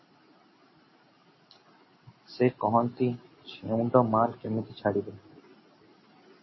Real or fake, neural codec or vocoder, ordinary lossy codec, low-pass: real; none; MP3, 24 kbps; 7.2 kHz